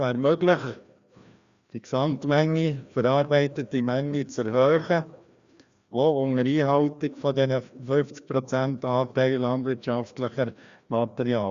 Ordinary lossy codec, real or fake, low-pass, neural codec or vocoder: Opus, 64 kbps; fake; 7.2 kHz; codec, 16 kHz, 1 kbps, FreqCodec, larger model